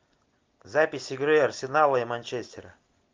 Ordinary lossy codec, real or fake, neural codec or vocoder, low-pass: Opus, 24 kbps; real; none; 7.2 kHz